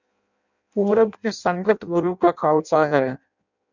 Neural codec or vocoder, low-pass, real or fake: codec, 16 kHz in and 24 kHz out, 0.6 kbps, FireRedTTS-2 codec; 7.2 kHz; fake